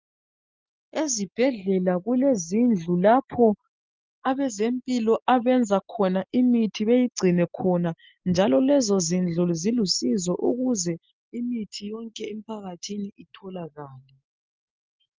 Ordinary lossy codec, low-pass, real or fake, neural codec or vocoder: Opus, 24 kbps; 7.2 kHz; real; none